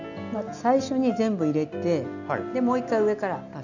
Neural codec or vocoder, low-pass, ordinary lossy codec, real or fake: none; 7.2 kHz; none; real